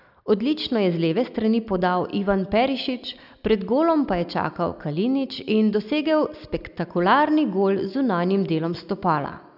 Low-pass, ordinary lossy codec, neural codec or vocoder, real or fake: 5.4 kHz; none; none; real